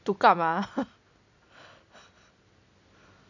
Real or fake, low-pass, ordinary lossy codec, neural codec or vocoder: real; 7.2 kHz; none; none